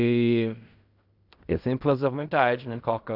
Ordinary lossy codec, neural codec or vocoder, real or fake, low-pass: none; codec, 16 kHz in and 24 kHz out, 0.4 kbps, LongCat-Audio-Codec, fine tuned four codebook decoder; fake; 5.4 kHz